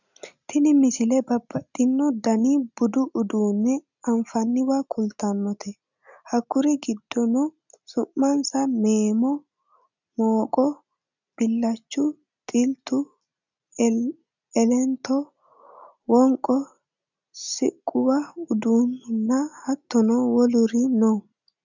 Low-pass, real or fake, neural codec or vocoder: 7.2 kHz; real; none